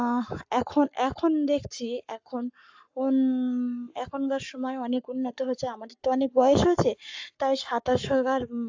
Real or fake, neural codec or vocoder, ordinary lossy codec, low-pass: fake; codec, 44.1 kHz, 7.8 kbps, Pupu-Codec; AAC, 48 kbps; 7.2 kHz